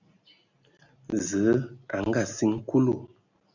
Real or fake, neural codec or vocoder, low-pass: real; none; 7.2 kHz